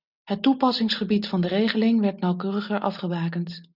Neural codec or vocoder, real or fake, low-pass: none; real; 5.4 kHz